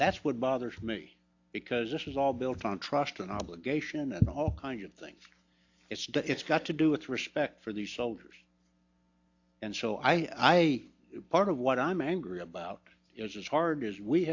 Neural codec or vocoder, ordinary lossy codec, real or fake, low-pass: none; AAC, 48 kbps; real; 7.2 kHz